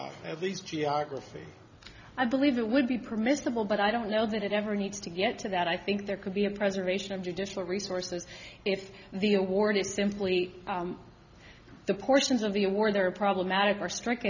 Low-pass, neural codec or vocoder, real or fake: 7.2 kHz; none; real